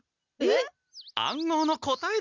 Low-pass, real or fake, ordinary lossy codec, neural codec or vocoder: 7.2 kHz; real; none; none